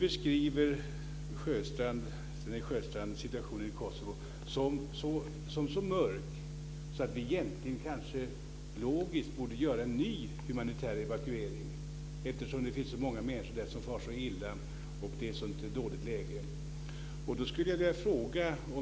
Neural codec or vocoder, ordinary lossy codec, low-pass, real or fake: none; none; none; real